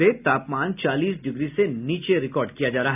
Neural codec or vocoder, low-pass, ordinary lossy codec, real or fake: none; 3.6 kHz; none; real